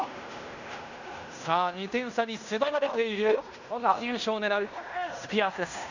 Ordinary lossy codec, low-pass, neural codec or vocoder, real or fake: none; 7.2 kHz; codec, 16 kHz in and 24 kHz out, 0.9 kbps, LongCat-Audio-Codec, fine tuned four codebook decoder; fake